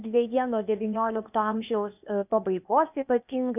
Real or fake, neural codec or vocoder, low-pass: fake; codec, 16 kHz, 0.8 kbps, ZipCodec; 3.6 kHz